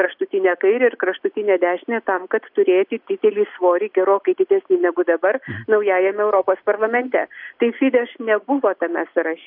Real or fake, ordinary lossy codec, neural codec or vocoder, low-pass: real; AAC, 48 kbps; none; 5.4 kHz